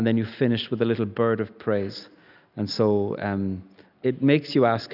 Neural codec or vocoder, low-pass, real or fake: none; 5.4 kHz; real